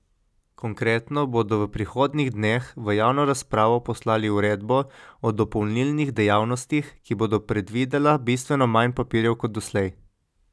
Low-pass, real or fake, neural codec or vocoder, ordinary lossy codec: none; real; none; none